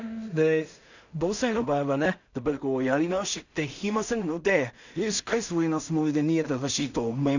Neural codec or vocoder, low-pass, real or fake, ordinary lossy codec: codec, 16 kHz in and 24 kHz out, 0.4 kbps, LongCat-Audio-Codec, two codebook decoder; 7.2 kHz; fake; none